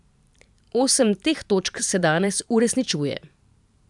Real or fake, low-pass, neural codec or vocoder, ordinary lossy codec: fake; 10.8 kHz; vocoder, 44.1 kHz, 128 mel bands every 512 samples, BigVGAN v2; none